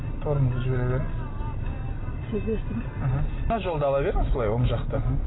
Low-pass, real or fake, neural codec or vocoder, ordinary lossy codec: 7.2 kHz; real; none; AAC, 16 kbps